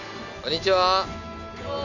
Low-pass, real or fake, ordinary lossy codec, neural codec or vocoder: 7.2 kHz; real; none; none